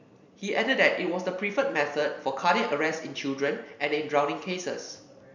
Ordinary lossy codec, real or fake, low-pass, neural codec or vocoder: none; fake; 7.2 kHz; vocoder, 44.1 kHz, 128 mel bands every 256 samples, BigVGAN v2